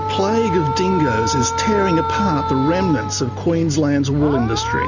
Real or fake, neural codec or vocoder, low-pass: real; none; 7.2 kHz